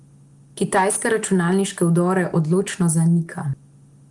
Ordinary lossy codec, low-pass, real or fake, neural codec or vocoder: Opus, 24 kbps; 10.8 kHz; real; none